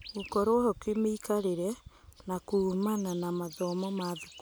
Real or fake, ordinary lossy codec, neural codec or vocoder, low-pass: real; none; none; none